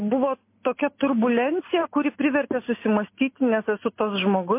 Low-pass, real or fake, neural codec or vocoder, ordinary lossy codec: 3.6 kHz; real; none; MP3, 24 kbps